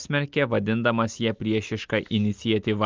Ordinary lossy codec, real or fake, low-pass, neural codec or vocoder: Opus, 24 kbps; real; 7.2 kHz; none